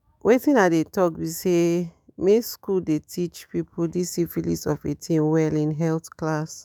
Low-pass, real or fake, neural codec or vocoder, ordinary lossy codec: none; fake; autoencoder, 48 kHz, 128 numbers a frame, DAC-VAE, trained on Japanese speech; none